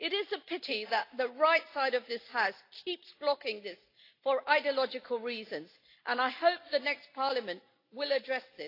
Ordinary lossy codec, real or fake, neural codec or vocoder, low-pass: AAC, 32 kbps; real; none; 5.4 kHz